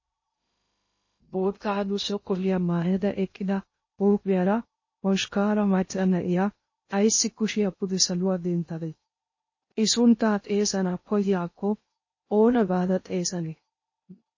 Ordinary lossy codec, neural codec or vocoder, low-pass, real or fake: MP3, 32 kbps; codec, 16 kHz in and 24 kHz out, 0.6 kbps, FocalCodec, streaming, 2048 codes; 7.2 kHz; fake